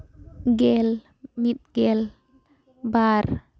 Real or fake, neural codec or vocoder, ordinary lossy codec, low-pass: real; none; none; none